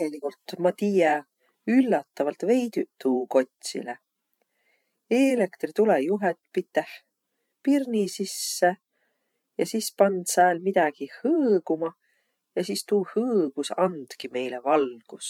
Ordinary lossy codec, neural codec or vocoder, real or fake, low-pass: MP3, 96 kbps; vocoder, 44.1 kHz, 128 mel bands every 512 samples, BigVGAN v2; fake; 19.8 kHz